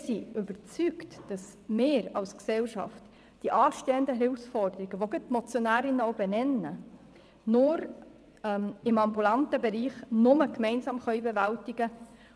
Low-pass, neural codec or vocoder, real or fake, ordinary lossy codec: none; vocoder, 22.05 kHz, 80 mel bands, WaveNeXt; fake; none